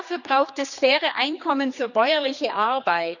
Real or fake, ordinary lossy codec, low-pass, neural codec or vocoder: fake; none; 7.2 kHz; codec, 16 kHz, 2 kbps, X-Codec, HuBERT features, trained on general audio